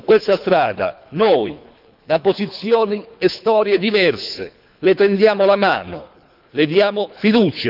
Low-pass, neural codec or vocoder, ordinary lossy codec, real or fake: 5.4 kHz; codec, 24 kHz, 3 kbps, HILCodec; none; fake